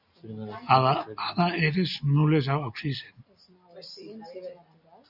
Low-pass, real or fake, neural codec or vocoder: 5.4 kHz; real; none